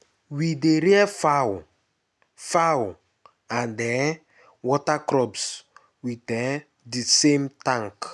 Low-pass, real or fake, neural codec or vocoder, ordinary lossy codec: none; real; none; none